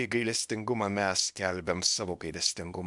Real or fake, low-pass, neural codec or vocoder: fake; 10.8 kHz; codec, 24 kHz, 0.9 kbps, WavTokenizer, small release